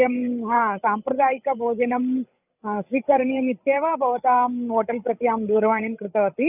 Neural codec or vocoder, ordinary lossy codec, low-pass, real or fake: codec, 16 kHz, 8 kbps, FreqCodec, larger model; none; 3.6 kHz; fake